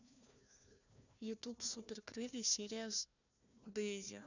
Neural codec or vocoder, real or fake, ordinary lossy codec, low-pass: codec, 16 kHz, 1 kbps, FunCodec, trained on Chinese and English, 50 frames a second; fake; none; 7.2 kHz